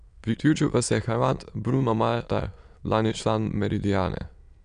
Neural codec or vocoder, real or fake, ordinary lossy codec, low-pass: autoencoder, 22.05 kHz, a latent of 192 numbers a frame, VITS, trained on many speakers; fake; none; 9.9 kHz